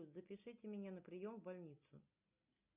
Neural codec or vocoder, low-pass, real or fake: none; 3.6 kHz; real